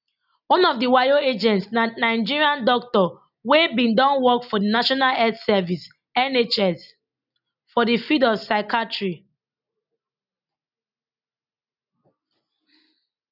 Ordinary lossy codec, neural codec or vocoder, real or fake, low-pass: none; none; real; 5.4 kHz